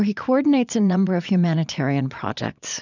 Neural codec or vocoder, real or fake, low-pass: vocoder, 22.05 kHz, 80 mel bands, Vocos; fake; 7.2 kHz